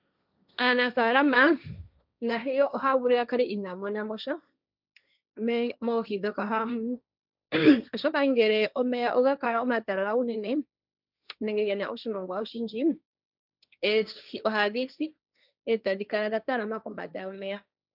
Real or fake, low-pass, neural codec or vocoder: fake; 5.4 kHz; codec, 16 kHz, 1.1 kbps, Voila-Tokenizer